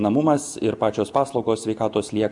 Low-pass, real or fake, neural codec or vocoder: 10.8 kHz; real; none